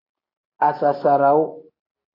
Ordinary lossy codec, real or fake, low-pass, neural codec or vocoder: AAC, 24 kbps; real; 5.4 kHz; none